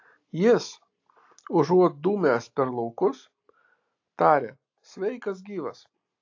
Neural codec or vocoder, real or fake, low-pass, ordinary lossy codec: none; real; 7.2 kHz; AAC, 48 kbps